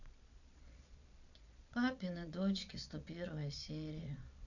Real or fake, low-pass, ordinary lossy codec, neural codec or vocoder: fake; 7.2 kHz; none; vocoder, 22.05 kHz, 80 mel bands, Vocos